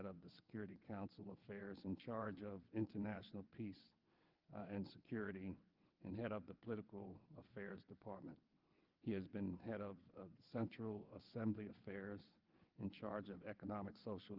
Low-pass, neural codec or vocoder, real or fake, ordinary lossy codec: 5.4 kHz; vocoder, 22.05 kHz, 80 mel bands, WaveNeXt; fake; Opus, 32 kbps